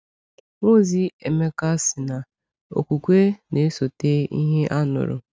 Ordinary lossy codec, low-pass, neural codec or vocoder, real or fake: none; none; none; real